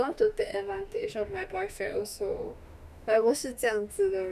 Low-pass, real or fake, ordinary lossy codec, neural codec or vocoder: 14.4 kHz; fake; none; autoencoder, 48 kHz, 32 numbers a frame, DAC-VAE, trained on Japanese speech